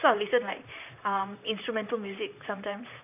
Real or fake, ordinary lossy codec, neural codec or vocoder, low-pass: fake; none; vocoder, 44.1 kHz, 128 mel bands, Pupu-Vocoder; 3.6 kHz